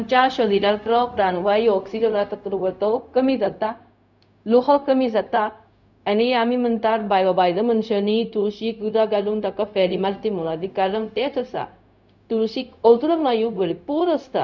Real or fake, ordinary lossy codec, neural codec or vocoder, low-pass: fake; none; codec, 16 kHz, 0.4 kbps, LongCat-Audio-Codec; 7.2 kHz